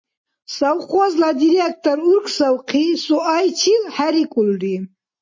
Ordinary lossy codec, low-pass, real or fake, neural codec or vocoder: MP3, 32 kbps; 7.2 kHz; real; none